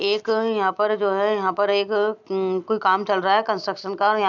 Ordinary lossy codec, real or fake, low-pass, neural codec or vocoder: none; real; 7.2 kHz; none